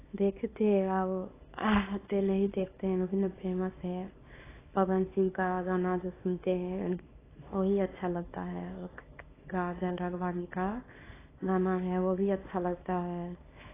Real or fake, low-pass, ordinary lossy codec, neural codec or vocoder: fake; 3.6 kHz; AAC, 16 kbps; codec, 24 kHz, 0.9 kbps, WavTokenizer, small release